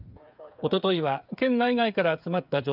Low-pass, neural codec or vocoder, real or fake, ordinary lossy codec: 5.4 kHz; codec, 16 kHz, 8 kbps, FreqCodec, smaller model; fake; none